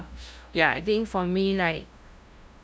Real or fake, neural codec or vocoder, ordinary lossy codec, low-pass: fake; codec, 16 kHz, 0.5 kbps, FunCodec, trained on LibriTTS, 25 frames a second; none; none